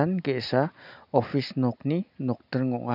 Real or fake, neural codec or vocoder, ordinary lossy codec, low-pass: real; none; none; 5.4 kHz